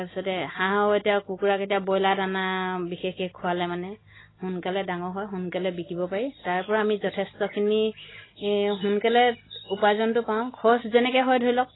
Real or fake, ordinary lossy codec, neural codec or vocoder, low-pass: real; AAC, 16 kbps; none; 7.2 kHz